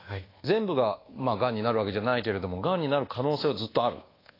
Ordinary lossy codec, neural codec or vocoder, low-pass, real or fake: AAC, 24 kbps; codec, 24 kHz, 1.2 kbps, DualCodec; 5.4 kHz; fake